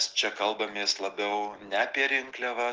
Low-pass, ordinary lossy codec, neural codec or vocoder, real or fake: 7.2 kHz; Opus, 16 kbps; none; real